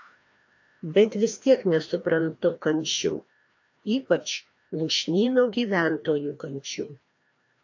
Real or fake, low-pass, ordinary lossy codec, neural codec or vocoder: fake; 7.2 kHz; AAC, 48 kbps; codec, 16 kHz, 1 kbps, FreqCodec, larger model